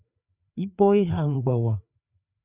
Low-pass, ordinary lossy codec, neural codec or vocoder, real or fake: 3.6 kHz; Opus, 64 kbps; codec, 16 kHz, 4 kbps, FreqCodec, larger model; fake